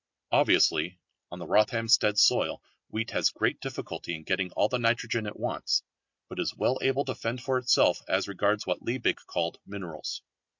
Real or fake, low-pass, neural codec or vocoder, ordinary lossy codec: real; 7.2 kHz; none; MP3, 48 kbps